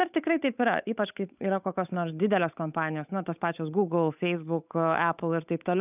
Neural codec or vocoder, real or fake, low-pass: codec, 16 kHz, 8 kbps, FunCodec, trained on Chinese and English, 25 frames a second; fake; 3.6 kHz